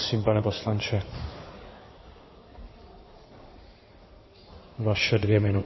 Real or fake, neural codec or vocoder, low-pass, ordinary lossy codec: fake; codec, 16 kHz in and 24 kHz out, 2.2 kbps, FireRedTTS-2 codec; 7.2 kHz; MP3, 24 kbps